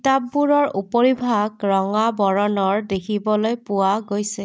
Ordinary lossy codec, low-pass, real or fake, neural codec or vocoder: none; none; real; none